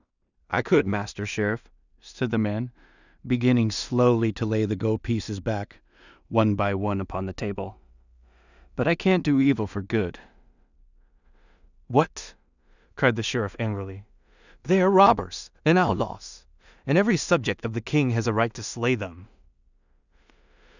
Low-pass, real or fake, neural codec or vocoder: 7.2 kHz; fake; codec, 16 kHz in and 24 kHz out, 0.4 kbps, LongCat-Audio-Codec, two codebook decoder